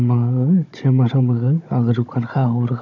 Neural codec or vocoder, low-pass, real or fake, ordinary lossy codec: codec, 16 kHz, 16 kbps, FunCodec, trained on Chinese and English, 50 frames a second; 7.2 kHz; fake; none